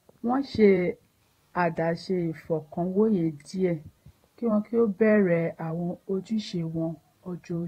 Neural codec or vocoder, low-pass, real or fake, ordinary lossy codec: vocoder, 44.1 kHz, 128 mel bands every 512 samples, BigVGAN v2; 19.8 kHz; fake; AAC, 48 kbps